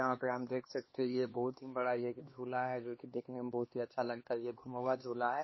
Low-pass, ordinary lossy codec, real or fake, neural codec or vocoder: 7.2 kHz; MP3, 24 kbps; fake; codec, 16 kHz, 2 kbps, X-Codec, HuBERT features, trained on LibriSpeech